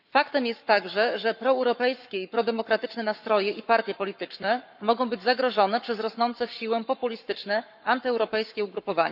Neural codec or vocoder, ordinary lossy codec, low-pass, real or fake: codec, 44.1 kHz, 7.8 kbps, Pupu-Codec; none; 5.4 kHz; fake